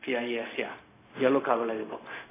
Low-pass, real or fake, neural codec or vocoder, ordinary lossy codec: 3.6 kHz; fake; codec, 16 kHz, 0.4 kbps, LongCat-Audio-Codec; AAC, 16 kbps